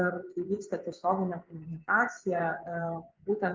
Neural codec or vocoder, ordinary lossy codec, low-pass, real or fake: vocoder, 24 kHz, 100 mel bands, Vocos; Opus, 24 kbps; 7.2 kHz; fake